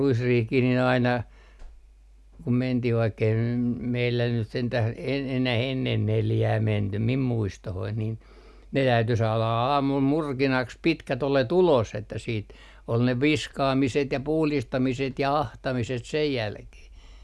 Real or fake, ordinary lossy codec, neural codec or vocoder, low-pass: real; none; none; none